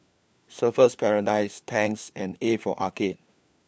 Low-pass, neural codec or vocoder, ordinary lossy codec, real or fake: none; codec, 16 kHz, 4 kbps, FunCodec, trained on LibriTTS, 50 frames a second; none; fake